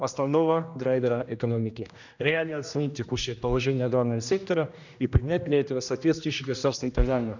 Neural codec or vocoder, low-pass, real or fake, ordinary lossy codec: codec, 16 kHz, 1 kbps, X-Codec, HuBERT features, trained on general audio; 7.2 kHz; fake; none